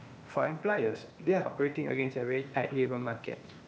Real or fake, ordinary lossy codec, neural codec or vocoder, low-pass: fake; none; codec, 16 kHz, 0.8 kbps, ZipCodec; none